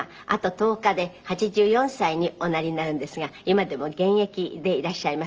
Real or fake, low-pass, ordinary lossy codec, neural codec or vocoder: real; 7.2 kHz; Opus, 24 kbps; none